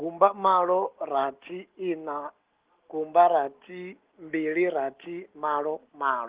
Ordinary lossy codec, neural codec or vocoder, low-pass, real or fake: Opus, 16 kbps; none; 3.6 kHz; real